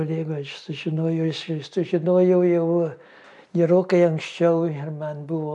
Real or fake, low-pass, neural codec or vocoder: real; 10.8 kHz; none